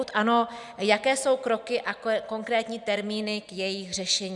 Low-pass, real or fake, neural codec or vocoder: 10.8 kHz; real; none